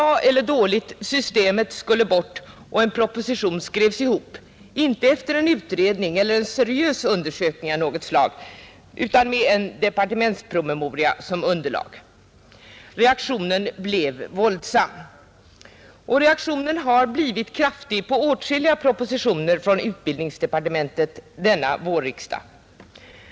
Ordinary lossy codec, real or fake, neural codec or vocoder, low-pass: none; real; none; none